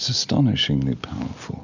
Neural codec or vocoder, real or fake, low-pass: none; real; 7.2 kHz